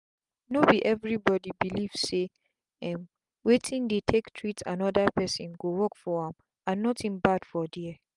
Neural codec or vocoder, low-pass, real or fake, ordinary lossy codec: none; 10.8 kHz; real; Opus, 32 kbps